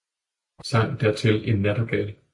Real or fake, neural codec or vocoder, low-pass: real; none; 10.8 kHz